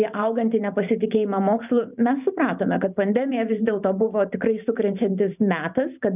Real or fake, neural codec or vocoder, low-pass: fake; vocoder, 44.1 kHz, 128 mel bands every 512 samples, BigVGAN v2; 3.6 kHz